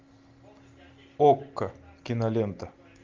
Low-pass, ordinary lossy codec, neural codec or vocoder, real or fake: 7.2 kHz; Opus, 32 kbps; none; real